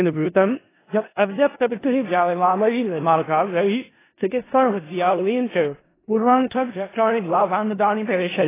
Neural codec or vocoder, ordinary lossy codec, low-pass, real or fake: codec, 16 kHz in and 24 kHz out, 0.4 kbps, LongCat-Audio-Codec, four codebook decoder; AAC, 16 kbps; 3.6 kHz; fake